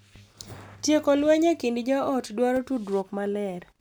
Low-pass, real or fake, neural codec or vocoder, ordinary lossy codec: none; real; none; none